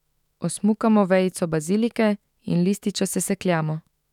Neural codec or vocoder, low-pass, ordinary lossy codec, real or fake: autoencoder, 48 kHz, 128 numbers a frame, DAC-VAE, trained on Japanese speech; 19.8 kHz; none; fake